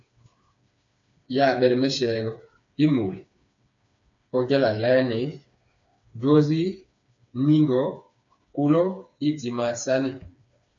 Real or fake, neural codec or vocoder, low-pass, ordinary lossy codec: fake; codec, 16 kHz, 4 kbps, FreqCodec, smaller model; 7.2 kHz; AAC, 64 kbps